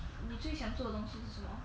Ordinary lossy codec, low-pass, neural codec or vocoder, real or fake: none; none; none; real